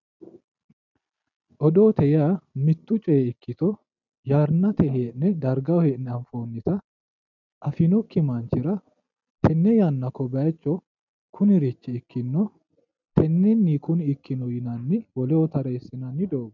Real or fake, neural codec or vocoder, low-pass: real; none; 7.2 kHz